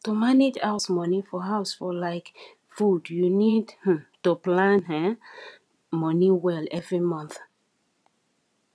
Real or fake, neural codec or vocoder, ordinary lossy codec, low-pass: fake; vocoder, 22.05 kHz, 80 mel bands, Vocos; none; none